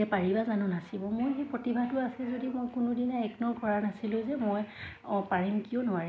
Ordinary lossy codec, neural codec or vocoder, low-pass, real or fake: none; none; none; real